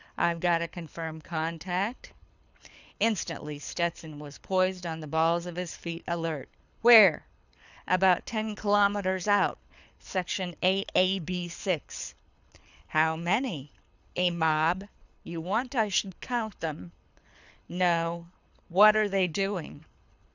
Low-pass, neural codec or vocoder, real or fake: 7.2 kHz; codec, 24 kHz, 6 kbps, HILCodec; fake